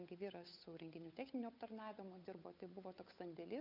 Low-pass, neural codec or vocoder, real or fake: 5.4 kHz; codec, 16 kHz, 8 kbps, FunCodec, trained on Chinese and English, 25 frames a second; fake